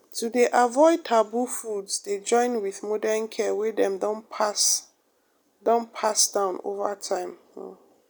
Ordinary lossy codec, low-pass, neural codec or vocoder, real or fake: none; none; none; real